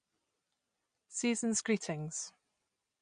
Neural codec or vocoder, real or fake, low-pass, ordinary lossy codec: none; real; 9.9 kHz; MP3, 48 kbps